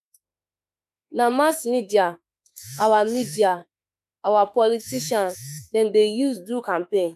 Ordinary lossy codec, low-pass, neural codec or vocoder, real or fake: none; 14.4 kHz; autoencoder, 48 kHz, 32 numbers a frame, DAC-VAE, trained on Japanese speech; fake